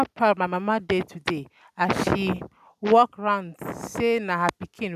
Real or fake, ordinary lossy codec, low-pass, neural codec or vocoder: real; none; 14.4 kHz; none